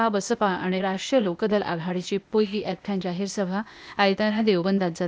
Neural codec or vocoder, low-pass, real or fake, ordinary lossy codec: codec, 16 kHz, 0.8 kbps, ZipCodec; none; fake; none